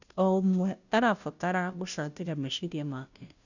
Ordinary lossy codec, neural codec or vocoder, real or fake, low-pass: none; codec, 16 kHz, 0.5 kbps, FunCodec, trained on Chinese and English, 25 frames a second; fake; 7.2 kHz